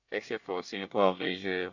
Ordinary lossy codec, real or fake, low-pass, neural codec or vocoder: none; fake; 7.2 kHz; codec, 24 kHz, 1 kbps, SNAC